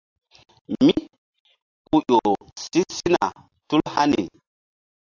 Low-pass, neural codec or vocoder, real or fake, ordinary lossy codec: 7.2 kHz; none; real; AAC, 48 kbps